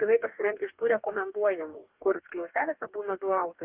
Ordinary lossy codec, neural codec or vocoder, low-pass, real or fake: Opus, 32 kbps; codec, 44.1 kHz, 2.6 kbps, DAC; 3.6 kHz; fake